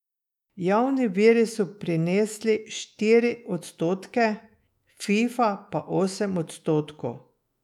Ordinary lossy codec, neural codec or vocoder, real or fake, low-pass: none; autoencoder, 48 kHz, 128 numbers a frame, DAC-VAE, trained on Japanese speech; fake; 19.8 kHz